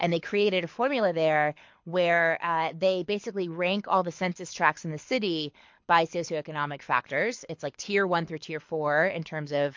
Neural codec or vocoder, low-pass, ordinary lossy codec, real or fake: codec, 24 kHz, 6 kbps, HILCodec; 7.2 kHz; MP3, 48 kbps; fake